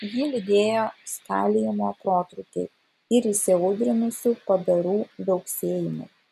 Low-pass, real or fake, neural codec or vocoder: 14.4 kHz; real; none